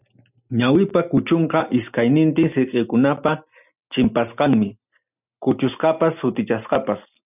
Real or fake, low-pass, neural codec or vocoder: real; 3.6 kHz; none